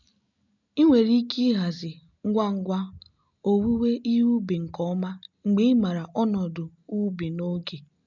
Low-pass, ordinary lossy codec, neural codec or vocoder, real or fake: 7.2 kHz; MP3, 64 kbps; none; real